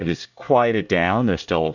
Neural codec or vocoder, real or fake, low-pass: codec, 24 kHz, 1 kbps, SNAC; fake; 7.2 kHz